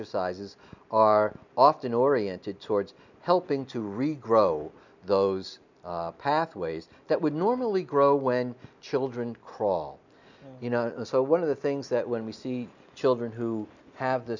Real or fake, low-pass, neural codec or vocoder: real; 7.2 kHz; none